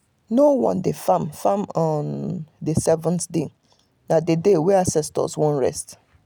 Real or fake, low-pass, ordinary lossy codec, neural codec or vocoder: real; none; none; none